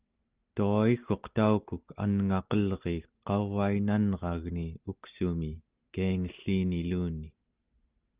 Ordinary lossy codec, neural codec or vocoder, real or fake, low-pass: Opus, 32 kbps; none; real; 3.6 kHz